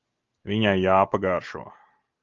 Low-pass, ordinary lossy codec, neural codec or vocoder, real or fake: 7.2 kHz; Opus, 32 kbps; none; real